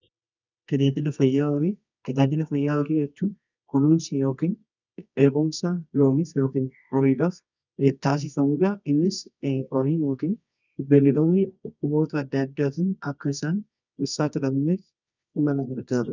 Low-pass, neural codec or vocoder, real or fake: 7.2 kHz; codec, 24 kHz, 0.9 kbps, WavTokenizer, medium music audio release; fake